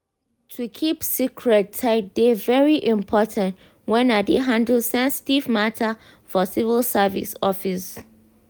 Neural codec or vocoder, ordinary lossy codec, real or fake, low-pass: none; none; real; none